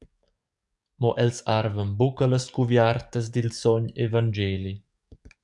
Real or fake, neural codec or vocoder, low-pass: fake; codec, 44.1 kHz, 7.8 kbps, DAC; 10.8 kHz